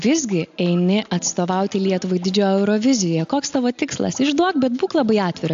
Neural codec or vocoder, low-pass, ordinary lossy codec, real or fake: none; 7.2 kHz; AAC, 64 kbps; real